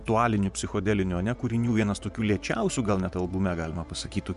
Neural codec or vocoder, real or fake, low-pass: vocoder, 24 kHz, 100 mel bands, Vocos; fake; 10.8 kHz